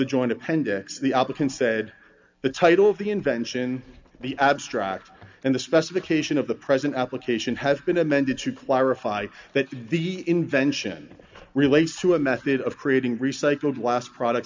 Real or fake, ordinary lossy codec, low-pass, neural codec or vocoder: real; MP3, 64 kbps; 7.2 kHz; none